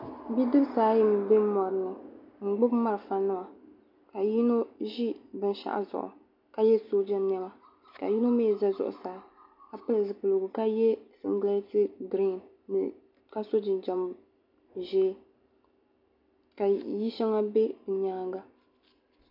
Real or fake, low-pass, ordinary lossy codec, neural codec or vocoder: real; 5.4 kHz; MP3, 48 kbps; none